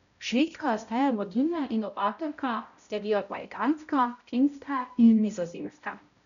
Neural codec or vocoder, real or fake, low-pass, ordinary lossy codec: codec, 16 kHz, 0.5 kbps, X-Codec, HuBERT features, trained on balanced general audio; fake; 7.2 kHz; none